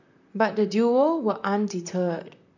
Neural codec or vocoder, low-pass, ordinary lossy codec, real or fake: vocoder, 44.1 kHz, 128 mel bands, Pupu-Vocoder; 7.2 kHz; none; fake